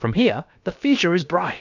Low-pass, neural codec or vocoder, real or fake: 7.2 kHz; codec, 16 kHz, about 1 kbps, DyCAST, with the encoder's durations; fake